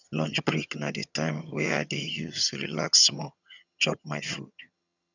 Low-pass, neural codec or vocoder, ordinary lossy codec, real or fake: 7.2 kHz; vocoder, 22.05 kHz, 80 mel bands, HiFi-GAN; none; fake